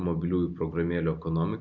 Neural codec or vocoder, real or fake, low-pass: none; real; 7.2 kHz